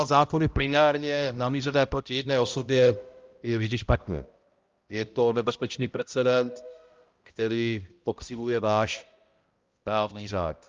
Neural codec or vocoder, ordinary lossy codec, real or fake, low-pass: codec, 16 kHz, 0.5 kbps, X-Codec, HuBERT features, trained on balanced general audio; Opus, 32 kbps; fake; 7.2 kHz